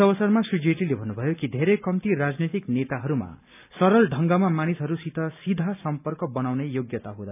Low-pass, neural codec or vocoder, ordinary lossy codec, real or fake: 3.6 kHz; none; none; real